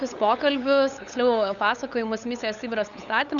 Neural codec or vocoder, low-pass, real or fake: codec, 16 kHz, 8 kbps, FunCodec, trained on LibriTTS, 25 frames a second; 7.2 kHz; fake